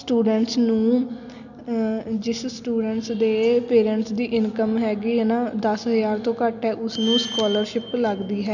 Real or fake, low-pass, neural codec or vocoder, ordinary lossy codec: real; 7.2 kHz; none; none